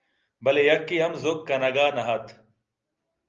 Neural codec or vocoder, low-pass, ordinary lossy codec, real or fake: none; 7.2 kHz; Opus, 32 kbps; real